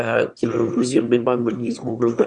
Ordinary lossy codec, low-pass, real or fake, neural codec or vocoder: Opus, 64 kbps; 9.9 kHz; fake; autoencoder, 22.05 kHz, a latent of 192 numbers a frame, VITS, trained on one speaker